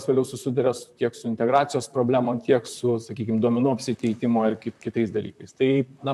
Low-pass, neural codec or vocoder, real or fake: 14.4 kHz; vocoder, 44.1 kHz, 128 mel bands, Pupu-Vocoder; fake